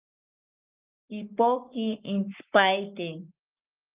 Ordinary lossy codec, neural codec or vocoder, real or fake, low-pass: Opus, 24 kbps; codec, 44.1 kHz, 7.8 kbps, Pupu-Codec; fake; 3.6 kHz